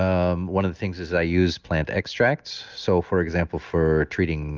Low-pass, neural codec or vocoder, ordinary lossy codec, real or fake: 7.2 kHz; none; Opus, 24 kbps; real